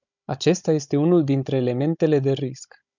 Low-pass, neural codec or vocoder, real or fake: 7.2 kHz; codec, 16 kHz, 16 kbps, FunCodec, trained on Chinese and English, 50 frames a second; fake